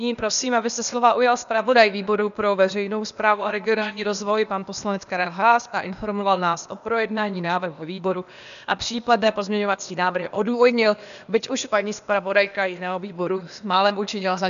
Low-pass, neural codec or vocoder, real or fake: 7.2 kHz; codec, 16 kHz, 0.8 kbps, ZipCodec; fake